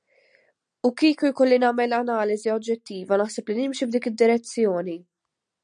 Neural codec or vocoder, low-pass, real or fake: none; 9.9 kHz; real